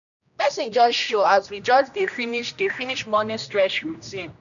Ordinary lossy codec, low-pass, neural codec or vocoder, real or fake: none; 7.2 kHz; codec, 16 kHz, 1 kbps, X-Codec, HuBERT features, trained on general audio; fake